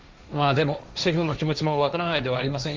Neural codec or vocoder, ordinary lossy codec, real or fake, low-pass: codec, 16 kHz, 1.1 kbps, Voila-Tokenizer; Opus, 32 kbps; fake; 7.2 kHz